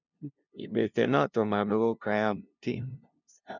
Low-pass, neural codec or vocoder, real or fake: 7.2 kHz; codec, 16 kHz, 0.5 kbps, FunCodec, trained on LibriTTS, 25 frames a second; fake